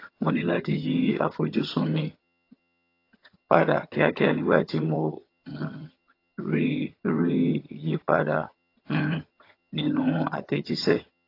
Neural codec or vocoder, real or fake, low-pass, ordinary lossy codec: vocoder, 22.05 kHz, 80 mel bands, HiFi-GAN; fake; 5.4 kHz; AAC, 32 kbps